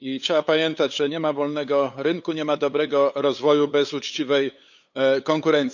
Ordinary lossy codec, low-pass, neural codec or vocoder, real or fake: none; 7.2 kHz; codec, 16 kHz, 8 kbps, FunCodec, trained on LibriTTS, 25 frames a second; fake